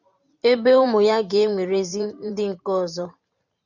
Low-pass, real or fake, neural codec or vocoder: 7.2 kHz; fake; vocoder, 44.1 kHz, 128 mel bands every 512 samples, BigVGAN v2